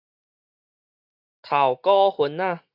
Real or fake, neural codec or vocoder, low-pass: real; none; 5.4 kHz